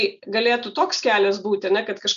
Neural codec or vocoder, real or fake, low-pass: none; real; 7.2 kHz